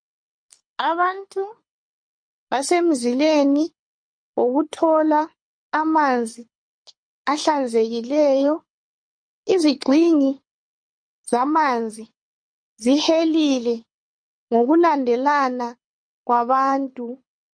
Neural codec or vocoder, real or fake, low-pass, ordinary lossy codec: codec, 24 kHz, 6 kbps, HILCodec; fake; 9.9 kHz; MP3, 48 kbps